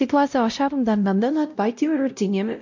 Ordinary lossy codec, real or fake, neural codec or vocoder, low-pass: MP3, 64 kbps; fake; codec, 16 kHz, 0.5 kbps, X-Codec, WavLM features, trained on Multilingual LibriSpeech; 7.2 kHz